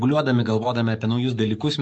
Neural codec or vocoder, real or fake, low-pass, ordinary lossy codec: codec, 44.1 kHz, 7.8 kbps, DAC; fake; 10.8 kHz; MP3, 48 kbps